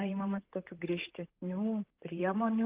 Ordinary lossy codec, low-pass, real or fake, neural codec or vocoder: Opus, 32 kbps; 3.6 kHz; fake; vocoder, 44.1 kHz, 128 mel bands, Pupu-Vocoder